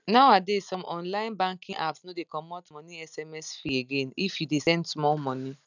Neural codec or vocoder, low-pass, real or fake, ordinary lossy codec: none; 7.2 kHz; real; none